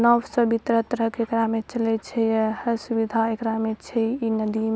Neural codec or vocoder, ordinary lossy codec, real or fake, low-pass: none; none; real; none